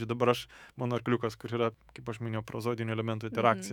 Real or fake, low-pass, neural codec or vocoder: fake; 19.8 kHz; autoencoder, 48 kHz, 128 numbers a frame, DAC-VAE, trained on Japanese speech